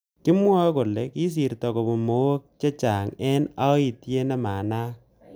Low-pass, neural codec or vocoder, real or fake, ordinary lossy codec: none; none; real; none